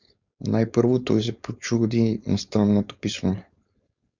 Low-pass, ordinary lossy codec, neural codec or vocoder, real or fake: 7.2 kHz; Opus, 64 kbps; codec, 16 kHz, 4.8 kbps, FACodec; fake